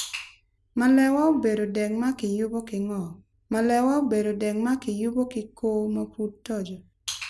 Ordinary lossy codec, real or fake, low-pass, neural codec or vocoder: none; real; none; none